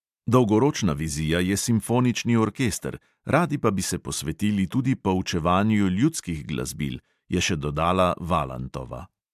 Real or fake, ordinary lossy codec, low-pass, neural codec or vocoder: real; MP3, 96 kbps; 14.4 kHz; none